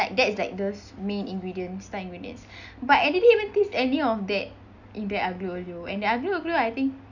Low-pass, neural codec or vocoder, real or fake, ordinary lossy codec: 7.2 kHz; none; real; none